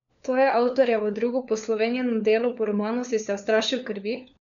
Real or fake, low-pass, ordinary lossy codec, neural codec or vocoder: fake; 7.2 kHz; MP3, 96 kbps; codec, 16 kHz, 4 kbps, FunCodec, trained on LibriTTS, 50 frames a second